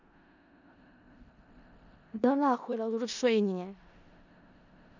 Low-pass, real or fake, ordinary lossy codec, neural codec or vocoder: 7.2 kHz; fake; none; codec, 16 kHz in and 24 kHz out, 0.4 kbps, LongCat-Audio-Codec, four codebook decoder